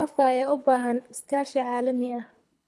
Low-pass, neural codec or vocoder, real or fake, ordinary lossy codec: none; codec, 24 kHz, 3 kbps, HILCodec; fake; none